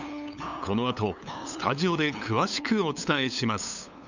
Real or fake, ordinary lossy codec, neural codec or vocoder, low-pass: fake; none; codec, 16 kHz, 8 kbps, FunCodec, trained on LibriTTS, 25 frames a second; 7.2 kHz